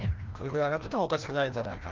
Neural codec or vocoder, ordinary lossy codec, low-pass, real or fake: codec, 16 kHz, 1 kbps, FreqCodec, larger model; Opus, 32 kbps; 7.2 kHz; fake